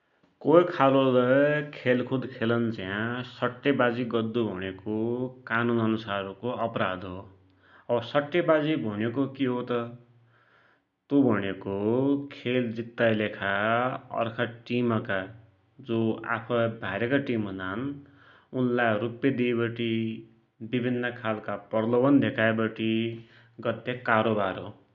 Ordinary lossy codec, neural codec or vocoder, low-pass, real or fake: none; none; 7.2 kHz; real